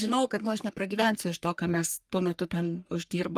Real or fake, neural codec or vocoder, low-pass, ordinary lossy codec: fake; codec, 44.1 kHz, 3.4 kbps, Pupu-Codec; 14.4 kHz; Opus, 32 kbps